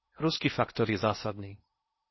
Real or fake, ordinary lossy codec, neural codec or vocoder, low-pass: fake; MP3, 24 kbps; codec, 16 kHz in and 24 kHz out, 0.8 kbps, FocalCodec, streaming, 65536 codes; 7.2 kHz